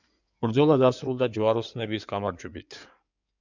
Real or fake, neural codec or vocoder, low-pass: fake; codec, 16 kHz in and 24 kHz out, 2.2 kbps, FireRedTTS-2 codec; 7.2 kHz